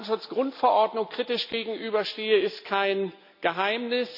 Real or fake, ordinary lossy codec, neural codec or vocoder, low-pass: real; none; none; 5.4 kHz